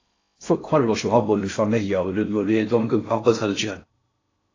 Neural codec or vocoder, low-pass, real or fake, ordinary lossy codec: codec, 16 kHz in and 24 kHz out, 0.6 kbps, FocalCodec, streaming, 4096 codes; 7.2 kHz; fake; AAC, 32 kbps